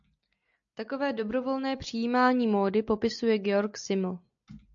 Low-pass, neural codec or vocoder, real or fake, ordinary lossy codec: 7.2 kHz; none; real; AAC, 64 kbps